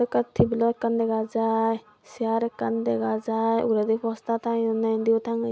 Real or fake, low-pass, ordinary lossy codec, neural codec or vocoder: real; none; none; none